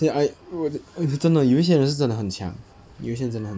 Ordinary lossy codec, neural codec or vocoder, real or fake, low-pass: none; none; real; none